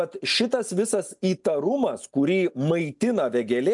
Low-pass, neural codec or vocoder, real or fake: 10.8 kHz; none; real